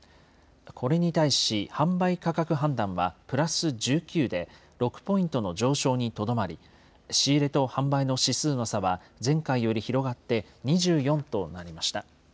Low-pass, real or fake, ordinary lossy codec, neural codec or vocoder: none; real; none; none